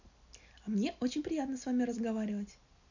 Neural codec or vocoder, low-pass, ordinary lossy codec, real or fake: none; 7.2 kHz; none; real